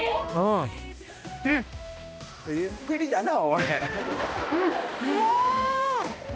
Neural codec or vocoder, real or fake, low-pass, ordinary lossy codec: codec, 16 kHz, 1 kbps, X-Codec, HuBERT features, trained on balanced general audio; fake; none; none